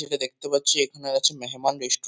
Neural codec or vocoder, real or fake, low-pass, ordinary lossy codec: none; real; none; none